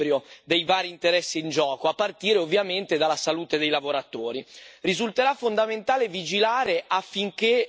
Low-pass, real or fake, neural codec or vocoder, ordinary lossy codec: none; real; none; none